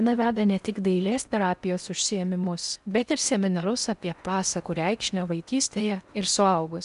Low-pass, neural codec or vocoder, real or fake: 10.8 kHz; codec, 16 kHz in and 24 kHz out, 0.8 kbps, FocalCodec, streaming, 65536 codes; fake